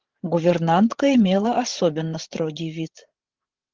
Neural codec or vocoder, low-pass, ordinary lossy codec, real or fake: codec, 16 kHz, 16 kbps, FreqCodec, larger model; 7.2 kHz; Opus, 16 kbps; fake